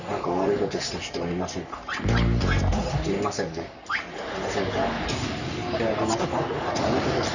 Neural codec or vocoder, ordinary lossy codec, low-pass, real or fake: codec, 44.1 kHz, 3.4 kbps, Pupu-Codec; none; 7.2 kHz; fake